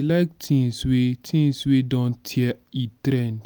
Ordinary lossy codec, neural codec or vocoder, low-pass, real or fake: none; none; none; real